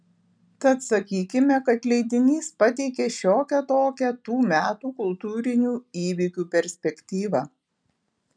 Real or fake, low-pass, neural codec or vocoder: real; 9.9 kHz; none